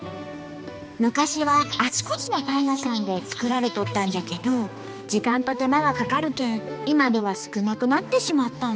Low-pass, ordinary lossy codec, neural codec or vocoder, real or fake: none; none; codec, 16 kHz, 2 kbps, X-Codec, HuBERT features, trained on balanced general audio; fake